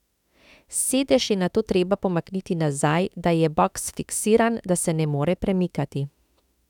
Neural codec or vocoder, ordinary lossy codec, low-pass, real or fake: autoencoder, 48 kHz, 32 numbers a frame, DAC-VAE, trained on Japanese speech; none; 19.8 kHz; fake